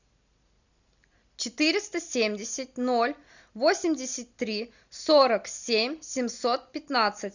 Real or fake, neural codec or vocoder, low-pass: real; none; 7.2 kHz